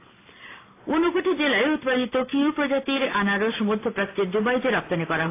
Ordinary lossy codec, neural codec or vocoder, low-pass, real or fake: AAC, 24 kbps; none; 3.6 kHz; real